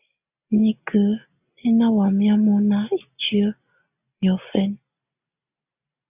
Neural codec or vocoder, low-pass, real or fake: none; 3.6 kHz; real